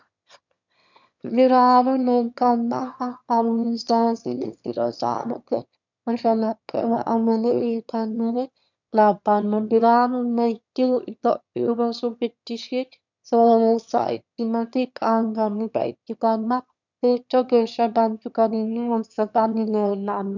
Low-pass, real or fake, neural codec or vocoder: 7.2 kHz; fake; autoencoder, 22.05 kHz, a latent of 192 numbers a frame, VITS, trained on one speaker